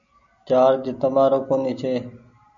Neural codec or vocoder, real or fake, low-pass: none; real; 7.2 kHz